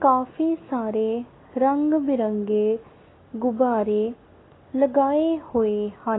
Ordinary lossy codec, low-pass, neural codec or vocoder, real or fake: AAC, 16 kbps; 7.2 kHz; none; real